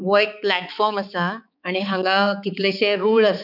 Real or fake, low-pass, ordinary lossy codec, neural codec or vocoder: fake; 5.4 kHz; none; codec, 16 kHz, 4 kbps, X-Codec, HuBERT features, trained on balanced general audio